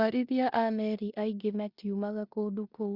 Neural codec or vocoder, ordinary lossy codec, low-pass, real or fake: codec, 16 kHz in and 24 kHz out, 0.9 kbps, LongCat-Audio-Codec, four codebook decoder; Opus, 64 kbps; 5.4 kHz; fake